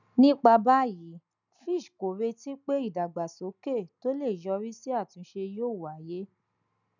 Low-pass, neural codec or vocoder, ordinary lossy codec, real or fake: 7.2 kHz; none; none; real